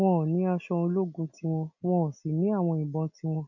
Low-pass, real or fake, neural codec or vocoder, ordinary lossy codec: 7.2 kHz; real; none; none